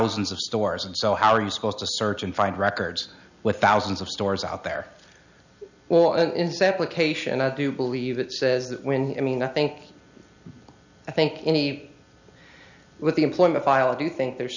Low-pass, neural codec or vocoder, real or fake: 7.2 kHz; none; real